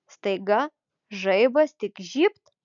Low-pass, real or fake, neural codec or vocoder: 7.2 kHz; real; none